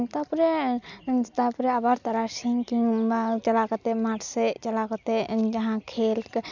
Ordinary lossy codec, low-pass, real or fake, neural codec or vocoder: none; 7.2 kHz; real; none